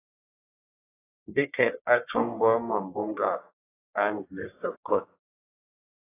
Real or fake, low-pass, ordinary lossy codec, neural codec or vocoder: fake; 3.6 kHz; AAC, 16 kbps; codec, 44.1 kHz, 1.7 kbps, Pupu-Codec